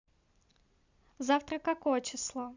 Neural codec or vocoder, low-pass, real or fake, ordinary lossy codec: none; 7.2 kHz; real; Opus, 64 kbps